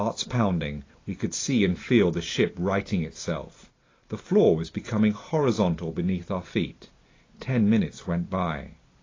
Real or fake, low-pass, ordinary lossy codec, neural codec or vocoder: real; 7.2 kHz; AAC, 32 kbps; none